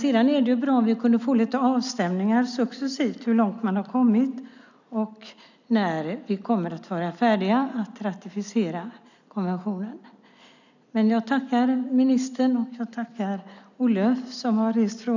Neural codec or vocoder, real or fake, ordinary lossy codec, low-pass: none; real; none; 7.2 kHz